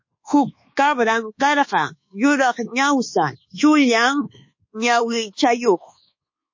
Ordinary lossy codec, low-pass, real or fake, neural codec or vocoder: MP3, 32 kbps; 7.2 kHz; fake; codec, 24 kHz, 1.2 kbps, DualCodec